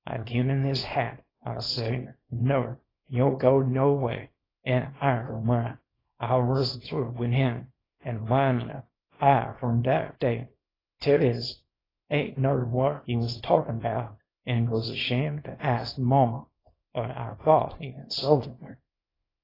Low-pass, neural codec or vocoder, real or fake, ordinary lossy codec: 5.4 kHz; codec, 24 kHz, 0.9 kbps, WavTokenizer, small release; fake; AAC, 24 kbps